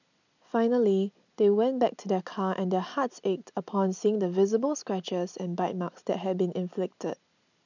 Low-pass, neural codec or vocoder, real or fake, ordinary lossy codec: 7.2 kHz; none; real; none